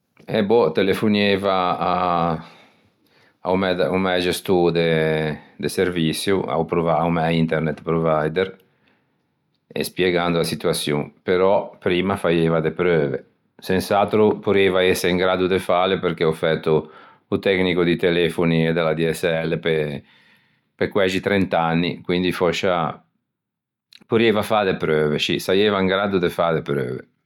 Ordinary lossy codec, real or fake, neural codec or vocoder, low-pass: none; real; none; 19.8 kHz